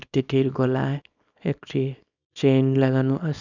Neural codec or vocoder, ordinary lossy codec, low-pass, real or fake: codec, 24 kHz, 0.9 kbps, WavTokenizer, small release; none; 7.2 kHz; fake